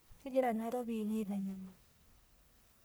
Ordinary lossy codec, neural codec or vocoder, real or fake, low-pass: none; codec, 44.1 kHz, 1.7 kbps, Pupu-Codec; fake; none